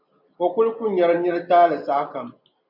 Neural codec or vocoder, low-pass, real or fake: none; 5.4 kHz; real